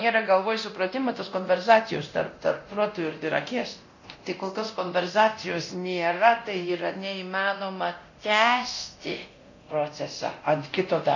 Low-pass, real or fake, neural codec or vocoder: 7.2 kHz; fake; codec, 24 kHz, 0.9 kbps, DualCodec